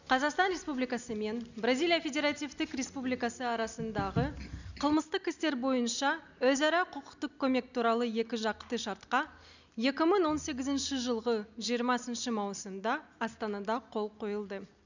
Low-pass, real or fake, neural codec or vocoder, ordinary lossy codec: 7.2 kHz; real; none; none